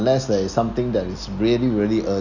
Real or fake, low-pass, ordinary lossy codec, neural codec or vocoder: real; 7.2 kHz; none; none